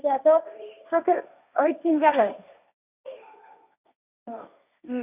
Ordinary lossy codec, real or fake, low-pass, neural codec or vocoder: none; fake; 3.6 kHz; codec, 16 kHz, 1.1 kbps, Voila-Tokenizer